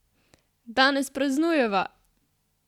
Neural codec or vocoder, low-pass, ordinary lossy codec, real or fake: none; 19.8 kHz; none; real